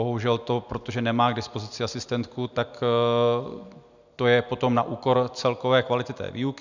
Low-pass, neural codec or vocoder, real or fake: 7.2 kHz; none; real